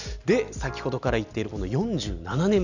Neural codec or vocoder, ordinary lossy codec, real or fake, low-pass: none; none; real; 7.2 kHz